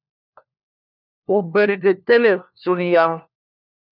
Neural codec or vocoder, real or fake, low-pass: codec, 16 kHz, 1 kbps, FunCodec, trained on LibriTTS, 50 frames a second; fake; 5.4 kHz